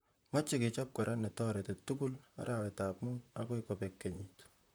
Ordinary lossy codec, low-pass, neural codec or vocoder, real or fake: none; none; vocoder, 44.1 kHz, 128 mel bands, Pupu-Vocoder; fake